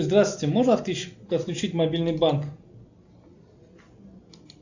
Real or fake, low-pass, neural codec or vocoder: real; 7.2 kHz; none